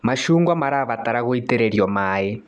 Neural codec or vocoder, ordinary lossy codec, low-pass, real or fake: none; none; 10.8 kHz; real